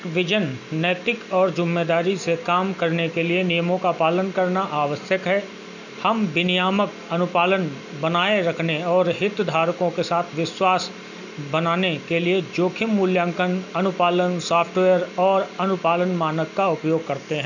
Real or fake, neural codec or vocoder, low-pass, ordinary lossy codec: real; none; 7.2 kHz; none